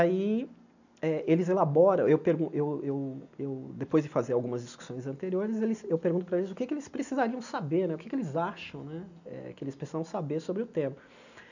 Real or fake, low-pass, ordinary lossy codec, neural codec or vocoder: real; 7.2 kHz; none; none